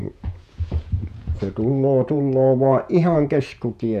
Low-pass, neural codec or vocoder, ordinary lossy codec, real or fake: 14.4 kHz; vocoder, 48 kHz, 128 mel bands, Vocos; none; fake